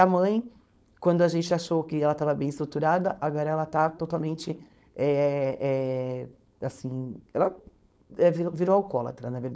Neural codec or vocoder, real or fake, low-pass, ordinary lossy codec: codec, 16 kHz, 4.8 kbps, FACodec; fake; none; none